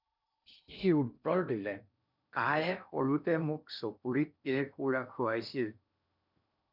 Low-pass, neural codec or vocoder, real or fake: 5.4 kHz; codec, 16 kHz in and 24 kHz out, 0.6 kbps, FocalCodec, streaming, 2048 codes; fake